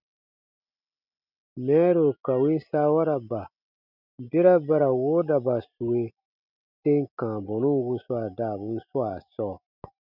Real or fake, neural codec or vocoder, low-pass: real; none; 5.4 kHz